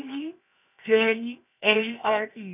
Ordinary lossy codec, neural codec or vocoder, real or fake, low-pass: none; codec, 16 kHz, 0.7 kbps, FocalCodec; fake; 3.6 kHz